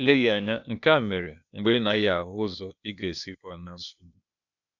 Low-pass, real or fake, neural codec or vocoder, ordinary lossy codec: 7.2 kHz; fake; codec, 16 kHz, 0.8 kbps, ZipCodec; none